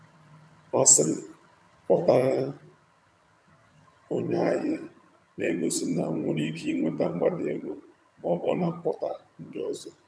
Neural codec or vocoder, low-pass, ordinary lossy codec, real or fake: vocoder, 22.05 kHz, 80 mel bands, HiFi-GAN; none; none; fake